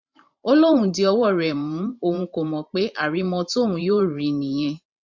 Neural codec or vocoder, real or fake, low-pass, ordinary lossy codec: vocoder, 44.1 kHz, 128 mel bands every 512 samples, BigVGAN v2; fake; 7.2 kHz; MP3, 64 kbps